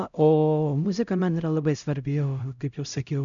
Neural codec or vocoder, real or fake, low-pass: codec, 16 kHz, 0.5 kbps, X-Codec, HuBERT features, trained on LibriSpeech; fake; 7.2 kHz